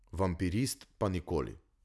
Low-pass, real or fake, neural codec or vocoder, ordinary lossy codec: none; real; none; none